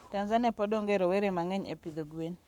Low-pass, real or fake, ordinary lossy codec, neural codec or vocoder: 19.8 kHz; fake; none; codec, 44.1 kHz, 7.8 kbps, Pupu-Codec